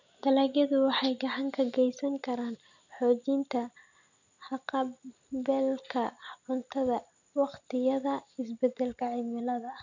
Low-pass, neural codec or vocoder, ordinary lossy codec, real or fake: 7.2 kHz; none; none; real